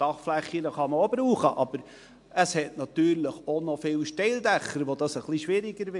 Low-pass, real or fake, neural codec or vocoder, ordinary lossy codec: 10.8 kHz; real; none; MP3, 64 kbps